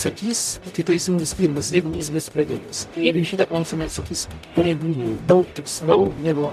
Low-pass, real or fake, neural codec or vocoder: 14.4 kHz; fake; codec, 44.1 kHz, 0.9 kbps, DAC